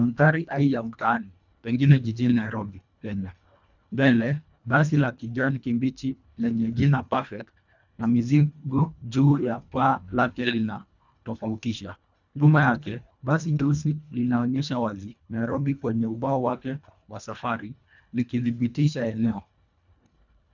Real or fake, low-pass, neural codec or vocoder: fake; 7.2 kHz; codec, 24 kHz, 1.5 kbps, HILCodec